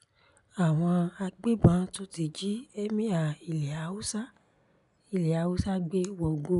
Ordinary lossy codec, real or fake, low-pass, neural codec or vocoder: none; real; 10.8 kHz; none